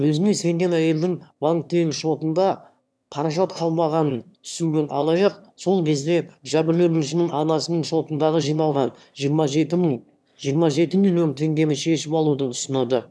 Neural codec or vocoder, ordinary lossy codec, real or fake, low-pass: autoencoder, 22.05 kHz, a latent of 192 numbers a frame, VITS, trained on one speaker; none; fake; none